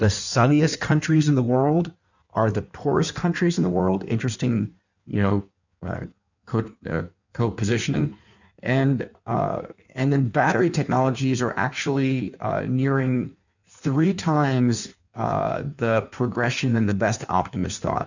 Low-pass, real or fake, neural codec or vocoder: 7.2 kHz; fake; codec, 16 kHz in and 24 kHz out, 1.1 kbps, FireRedTTS-2 codec